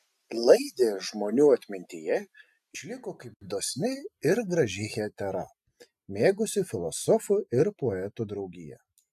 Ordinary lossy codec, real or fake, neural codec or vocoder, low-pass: AAC, 96 kbps; real; none; 14.4 kHz